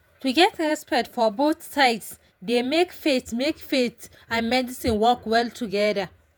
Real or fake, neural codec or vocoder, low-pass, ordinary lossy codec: fake; vocoder, 48 kHz, 128 mel bands, Vocos; none; none